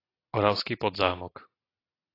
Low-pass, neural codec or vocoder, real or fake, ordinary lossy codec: 5.4 kHz; none; real; AAC, 24 kbps